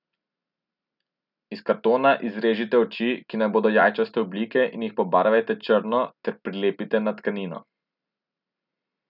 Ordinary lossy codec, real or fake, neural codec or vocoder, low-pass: none; real; none; 5.4 kHz